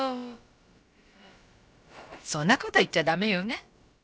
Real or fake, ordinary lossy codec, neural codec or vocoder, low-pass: fake; none; codec, 16 kHz, about 1 kbps, DyCAST, with the encoder's durations; none